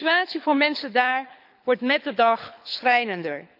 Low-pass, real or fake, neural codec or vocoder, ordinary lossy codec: 5.4 kHz; fake; codec, 24 kHz, 6 kbps, HILCodec; none